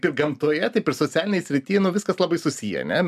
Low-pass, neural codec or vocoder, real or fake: 14.4 kHz; none; real